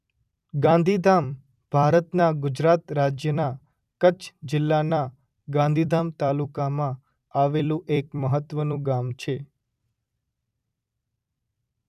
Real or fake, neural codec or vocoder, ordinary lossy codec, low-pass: fake; vocoder, 44.1 kHz, 128 mel bands every 256 samples, BigVGAN v2; none; 14.4 kHz